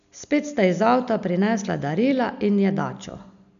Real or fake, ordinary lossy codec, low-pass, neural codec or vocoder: real; none; 7.2 kHz; none